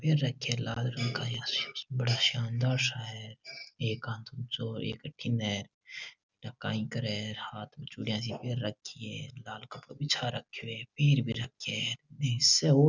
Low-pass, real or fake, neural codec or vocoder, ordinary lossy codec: 7.2 kHz; real; none; none